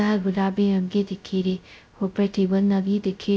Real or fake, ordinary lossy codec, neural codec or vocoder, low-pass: fake; none; codec, 16 kHz, 0.2 kbps, FocalCodec; none